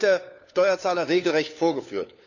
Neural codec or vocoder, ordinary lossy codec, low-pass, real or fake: codec, 16 kHz, 4 kbps, FunCodec, trained on LibriTTS, 50 frames a second; none; 7.2 kHz; fake